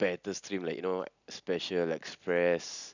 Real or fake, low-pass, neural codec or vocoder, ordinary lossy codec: real; 7.2 kHz; none; none